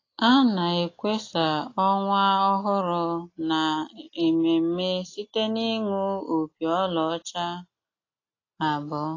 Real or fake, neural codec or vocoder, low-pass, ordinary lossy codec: real; none; 7.2 kHz; AAC, 32 kbps